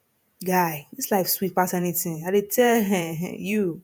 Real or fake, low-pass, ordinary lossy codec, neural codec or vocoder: fake; none; none; vocoder, 48 kHz, 128 mel bands, Vocos